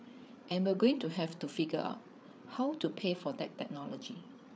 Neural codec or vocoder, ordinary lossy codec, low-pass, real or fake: codec, 16 kHz, 8 kbps, FreqCodec, larger model; none; none; fake